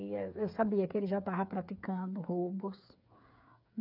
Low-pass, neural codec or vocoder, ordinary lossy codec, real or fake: 5.4 kHz; codec, 16 kHz, 8 kbps, FreqCodec, smaller model; none; fake